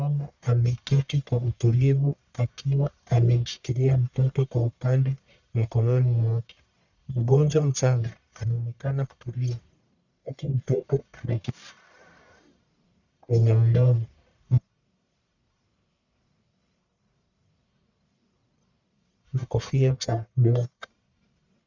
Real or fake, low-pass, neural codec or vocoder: fake; 7.2 kHz; codec, 44.1 kHz, 1.7 kbps, Pupu-Codec